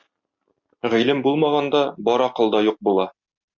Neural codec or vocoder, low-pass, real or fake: none; 7.2 kHz; real